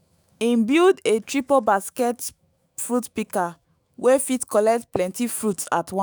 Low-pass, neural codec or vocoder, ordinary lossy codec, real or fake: none; autoencoder, 48 kHz, 128 numbers a frame, DAC-VAE, trained on Japanese speech; none; fake